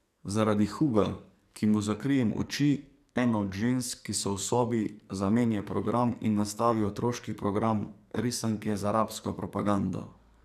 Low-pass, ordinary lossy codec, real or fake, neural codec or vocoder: 14.4 kHz; none; fake; codec, 44.1 kHz, 2.6 kbps, SNAC